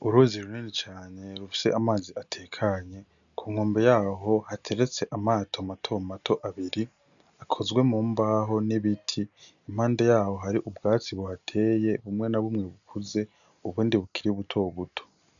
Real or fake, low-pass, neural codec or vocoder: real; 7.2 kHz; none